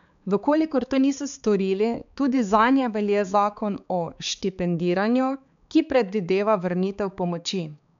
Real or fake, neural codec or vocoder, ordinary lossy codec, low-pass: fake; codec, 16 kHz, 4 kbps, X-Codec, HuBERT features, trained on balanced general audio; none; 7.2 kHz